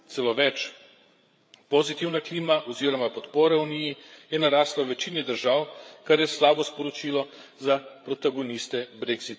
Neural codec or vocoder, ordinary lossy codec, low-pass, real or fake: codec, 16 kHz, 8 kbps, FreqCodec, larger model; none; none; fake